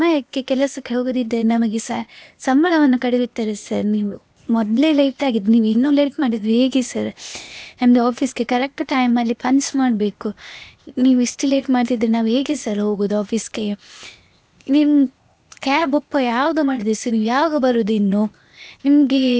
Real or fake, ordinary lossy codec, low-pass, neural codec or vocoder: fake; none; none; codec, 16 kHz, 0.8 kbps, ZipCodec